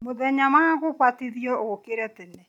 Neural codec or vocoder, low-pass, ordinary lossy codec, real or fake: none; 19.8 kHz; none; real